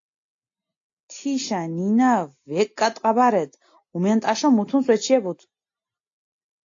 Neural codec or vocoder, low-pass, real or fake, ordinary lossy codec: none; 7.2 kHz; real; AAC, 48 kbps